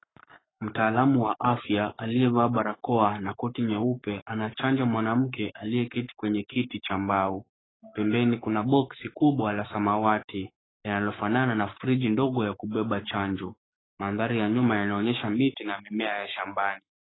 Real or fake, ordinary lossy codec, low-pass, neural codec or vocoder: fake; AAC, 16 kbps; 7.2 kHz; codec, 44.1 kHz, 7.8 kbps, Pupu-Codec